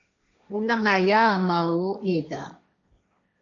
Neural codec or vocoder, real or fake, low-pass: codec, 16 kHz, 1.1 kbps, Voila-Tokenizer; fake; 7.2 kHz